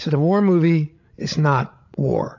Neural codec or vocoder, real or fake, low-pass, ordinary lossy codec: codec, 16 kHz, 16 kbps, FreqCodec, larger model; fake; 7.2 kHz; AAC, 48 kbps